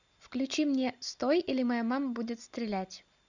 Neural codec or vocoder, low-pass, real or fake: none; 7.2 kHz; real